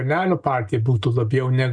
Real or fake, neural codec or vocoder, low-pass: real; none; 9.9 kHz